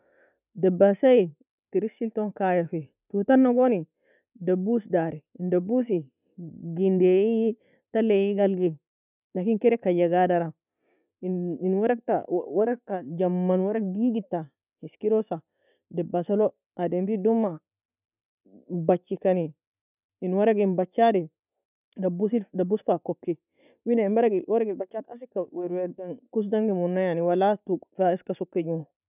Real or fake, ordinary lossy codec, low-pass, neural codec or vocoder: real; none; 3.6 kHz; none